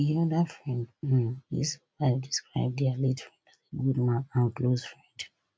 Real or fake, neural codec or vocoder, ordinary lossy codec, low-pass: real; none; none; none